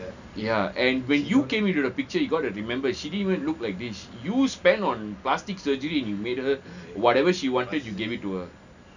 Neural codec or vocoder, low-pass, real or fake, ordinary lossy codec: none; 7.2 kHz; real; none